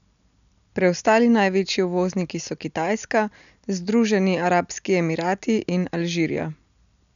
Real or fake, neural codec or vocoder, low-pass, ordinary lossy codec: real; none; 7.2 kHz; none